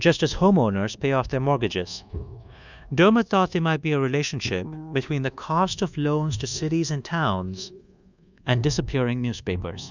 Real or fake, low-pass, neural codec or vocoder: fake; 7.2 kHz; codec, 24 kHz, 1.2 kbps, DualCodec